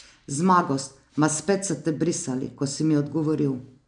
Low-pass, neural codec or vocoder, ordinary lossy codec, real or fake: 9.9 kHz; none; MP3, 96 kbps; real